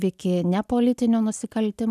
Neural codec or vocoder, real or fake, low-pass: none; real; 14.4 kHz